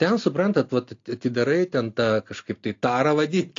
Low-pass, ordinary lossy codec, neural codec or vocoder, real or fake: 7.2 kHz; AAC, 48 kbps; none; real